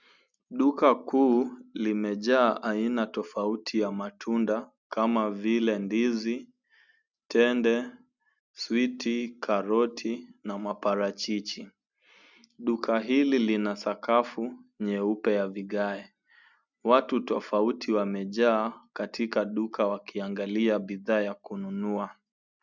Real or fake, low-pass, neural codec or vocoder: real; 7.2 kHz; none